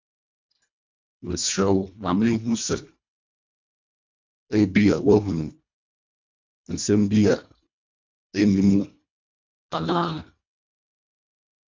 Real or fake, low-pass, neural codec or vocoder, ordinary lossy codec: fake; 7.2 kHz; codec, 24 kHz, 1.5 kbps, HILCodec; MP3, 64 kbps